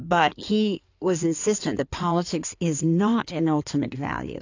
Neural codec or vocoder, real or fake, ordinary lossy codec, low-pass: codec, 16 kHz in and 24 kHz out, 2.2 kbps, FireRedTTS-2 codec; fake; AAC, 48 kbps; 7.2 kHz